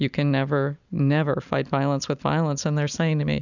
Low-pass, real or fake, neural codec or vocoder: 7.2 kHz; real; none